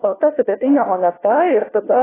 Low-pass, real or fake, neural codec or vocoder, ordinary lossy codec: 3.6 kHz; fake; codec, 16 kHz, 1 kbps, FunCodec, trained on LibriTTS, 50 frames a second; AAC, 16 kbps